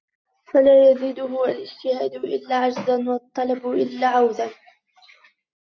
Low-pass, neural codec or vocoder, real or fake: 7.2 kHz; none; real